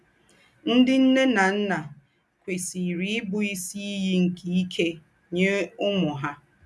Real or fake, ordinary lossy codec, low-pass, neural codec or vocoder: real; none; none; none